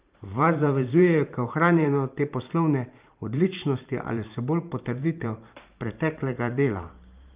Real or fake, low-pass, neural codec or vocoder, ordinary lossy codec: fake; 3.6 kHz; vocoder, 44.1 kHz, 128 mel bands every 512 samples, BigVGAN v2; Opus, 24 kbps